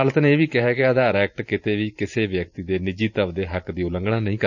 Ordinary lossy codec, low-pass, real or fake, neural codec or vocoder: none; 7.2 kHz; fake; vocoder, 44.1 kHz, 128 mel bands every 512 samples, BigVGAN v2